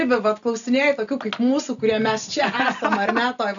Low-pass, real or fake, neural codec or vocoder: 7.2 kHz; real; none